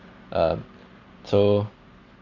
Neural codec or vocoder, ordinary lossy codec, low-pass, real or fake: none; Opus, 64 kbps; 7.2 kHz; real